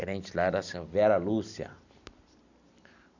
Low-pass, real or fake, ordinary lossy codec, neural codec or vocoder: 7.2 kHz; real; none; none